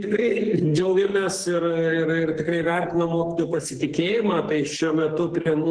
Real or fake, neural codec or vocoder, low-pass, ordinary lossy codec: fake; codec, 44.1 kHz, 2.6 kbps, SNAC; 9.9 kHz; Opus, 16 kbps